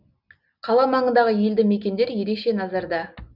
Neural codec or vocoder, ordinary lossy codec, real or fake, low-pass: none; none; real; 5.4 kHz